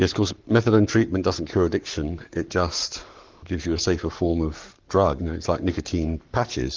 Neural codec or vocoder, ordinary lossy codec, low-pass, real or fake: codec, 44.1 kHz, 7.8 kbps, Pupu-Codec; Opus, 32 kbps; 7.2 kHz; fake